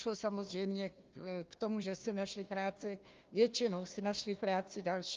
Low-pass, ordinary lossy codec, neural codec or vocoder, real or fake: 7.2 kHz; Opus, 32 kbps; codec, 16 kHz, 1 kbps, FunCodec, trained on Chinese and English, 50 frames a second; fake